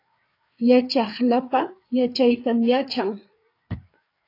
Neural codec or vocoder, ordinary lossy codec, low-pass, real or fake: codec, 16 kHz, 4 kbps, FreqCodec, larger model; AAC, 32 kbps; 5.4 kHz; fake